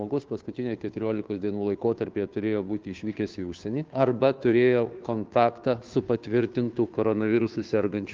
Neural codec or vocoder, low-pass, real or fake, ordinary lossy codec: codec, 16 kHz, 2 kbps, FunCodec, trained on Chinese and English, 25 frames a second; 7.2 kHz; fake; Opus, 32 kbps